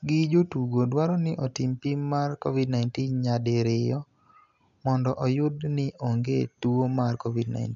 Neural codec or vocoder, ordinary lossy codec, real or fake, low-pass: none; MP3, 96 kbps; real; 7.2 kHz